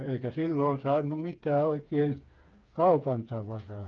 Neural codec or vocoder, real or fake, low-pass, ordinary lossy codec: codec, 16 kHz, 4 kbps, FreqCodec, smaller model; fake; 7.2 kHz; Opus, 24 kbps